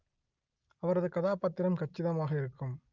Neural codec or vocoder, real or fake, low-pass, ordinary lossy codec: none; real; 7.2 kHz; Opus, 24 kbps